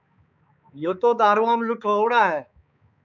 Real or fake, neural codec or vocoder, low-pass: fake; codec, 16 kHz, 4 kbps, X-Codec, HuBERT features, trained on balanced general audio; 7.2 kHz